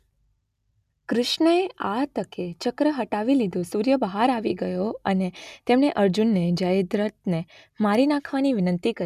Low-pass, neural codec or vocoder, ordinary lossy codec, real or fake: 14.4 kHz; none; none; real